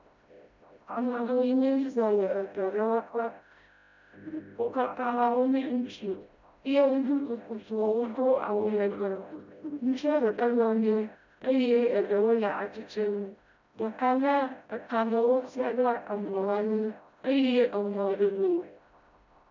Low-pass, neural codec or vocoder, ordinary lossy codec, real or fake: 7.2 kHz; codec, 16 kHz, 0.5 kbps, FreqCodec, smaller model; MP3, 64 kbps; fake